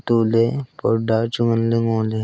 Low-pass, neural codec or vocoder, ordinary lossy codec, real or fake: none; none; none; real